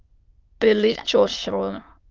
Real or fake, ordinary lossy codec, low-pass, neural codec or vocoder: fake; Opus, 24 kbps; 7.2 kHz; autoencoder, 22.05 kHz, a latent of 192 numbers a frame, VITS, trained on many speakers